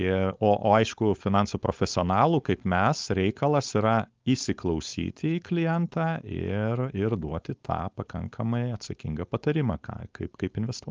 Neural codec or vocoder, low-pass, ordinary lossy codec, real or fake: codec, 16 kHz, 4.8 kbps, FACodec; 7.2 kHz; Opus, 24 kbps; fake